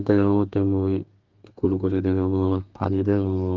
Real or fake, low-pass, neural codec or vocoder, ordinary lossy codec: fake; 7.2 kHz; codec, 32 kHz, 1.9 kbps, SNAC; Opus, 32 kbps